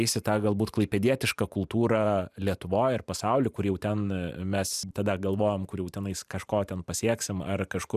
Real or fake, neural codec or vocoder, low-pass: fake; vocoder, 44.1 kHz, 128 mel bands every 512 samples, BigVGAN v2; 14.4 kHz